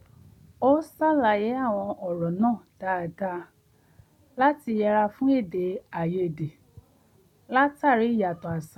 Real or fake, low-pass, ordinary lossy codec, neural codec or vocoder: real; 19.8 kHz; none; none